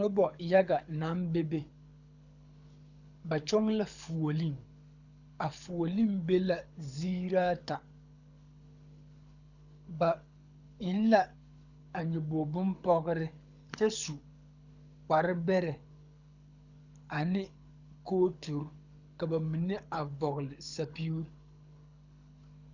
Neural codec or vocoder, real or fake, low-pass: codec, 24 kHz, 6 kbps, HILCodec; fake; 7.2 kHz